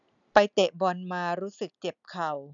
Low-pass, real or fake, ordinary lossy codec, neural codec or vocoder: 7.2 kHz; real; none; none